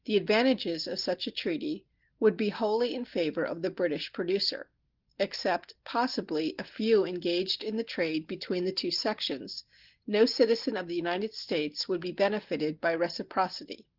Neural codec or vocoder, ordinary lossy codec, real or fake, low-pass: none; Opus, 16 kbps; real; 5.4 kHz